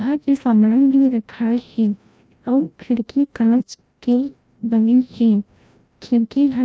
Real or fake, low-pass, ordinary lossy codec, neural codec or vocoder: fake; none; none; codec, 16 kHz, 0.5 kbps, FreqCodec, larger model